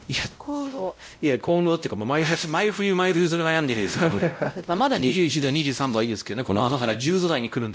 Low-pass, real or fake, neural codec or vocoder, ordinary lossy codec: none; fake; codec, 16 kHz, 0.5 kbps, X-Codec, WavLM features, trained on Multilingual LibriSpeech; none